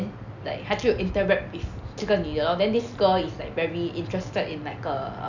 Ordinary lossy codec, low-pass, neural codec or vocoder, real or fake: none; 7.2 kHz; none; real